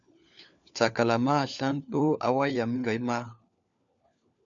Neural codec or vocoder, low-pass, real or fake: codec, 16 kHz, 4 kbps, FunCodec, trained on LibriTTS, 50 frames a second; 7.2 kHz; fake